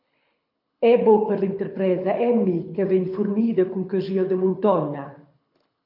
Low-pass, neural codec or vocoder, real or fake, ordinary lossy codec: 5.4 kHz; codec, 24 kHz, 6 kbps, HILCodec; fake; MP3, 48 kbps